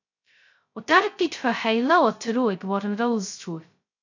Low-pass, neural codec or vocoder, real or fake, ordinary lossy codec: 7.2 kHz; codec, 16 kHz, 0.2 kbps, FocalCodec; fake; AAC, 48 kbps